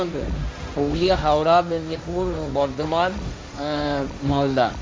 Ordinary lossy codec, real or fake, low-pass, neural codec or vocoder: none; fake; none; codec, 16 kHz, 1.1 kbps, Voila-Tokenizer